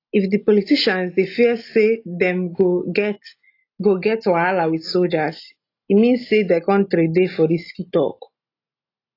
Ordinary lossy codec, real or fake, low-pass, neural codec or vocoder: AAC, 32 kbps; real; 5.4 kHz; none